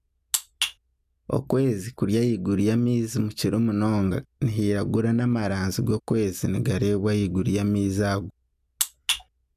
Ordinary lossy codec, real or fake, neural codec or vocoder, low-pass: none; real; none; 14.4 kHz